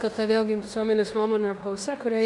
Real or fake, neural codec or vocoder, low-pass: fake; codec, 16 kHz in and 24 kHz out, 0.9 kbps, LongCat-Audio-Codec, fine tuned four codebook decoder; 10.8 kHz